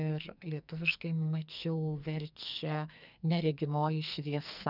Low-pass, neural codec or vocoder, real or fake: 5.4 kHz; codec, 44.1 kHz, 2.6 kbps, SNAC; fake